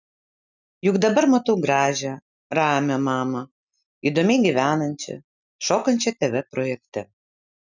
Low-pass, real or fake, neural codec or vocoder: 7.2 kHz; real; none